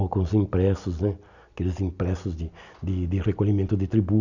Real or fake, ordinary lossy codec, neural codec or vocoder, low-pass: real; none; none; 7.2 kHz